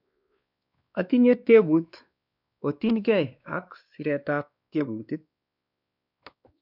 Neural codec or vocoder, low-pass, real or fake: codec, 16 kHz, 2 kbps, X-Codec, WavLM features, trained on Multilingual LibriSpeech; 5.4 kHz; fake